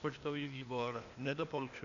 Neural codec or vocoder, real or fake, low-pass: codec, 16 kHz, 0.8 kbps, ZipCodec; fake; 7.2 kHz